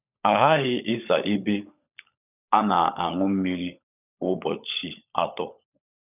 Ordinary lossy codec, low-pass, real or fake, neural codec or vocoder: none; 3.6 kHz; fake; codec, 16 kHz, 16 kbps, FunCodec, trained on LibriTTS, 50 frames a second